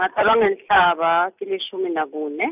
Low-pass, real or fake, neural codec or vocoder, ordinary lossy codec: 3.6 kHz; real; none; none